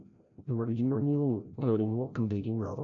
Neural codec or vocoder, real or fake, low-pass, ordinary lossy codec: codec, 16 kHz, 0.5 kbps, FreqCodec, larger model; fake; 7.2 kHz; none